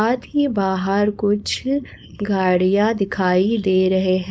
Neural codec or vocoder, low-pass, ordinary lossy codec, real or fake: codec, 16 kHz, 4.8 kbps, FACodec; none; none; fake